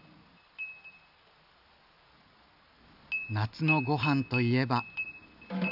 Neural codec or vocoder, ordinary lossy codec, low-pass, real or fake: none; AAC, 48 kbps; 5.4 kHz; real